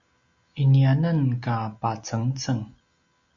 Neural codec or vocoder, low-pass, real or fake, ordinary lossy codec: none; 7.2 kHz; real; Opus, 64 kbps